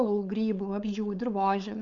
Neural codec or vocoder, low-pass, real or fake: codec, 16 kHz, 4.8 kbps, FACodec; 7.2 kHz; fake